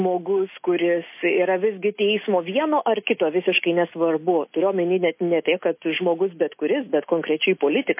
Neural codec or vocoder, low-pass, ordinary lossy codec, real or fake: none; 3.6 kHz; MP3, 24 kbps; real